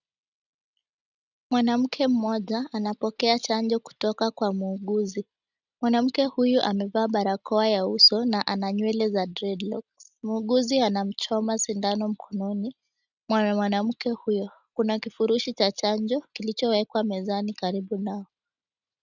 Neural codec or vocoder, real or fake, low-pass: none; real; 7.2 kHz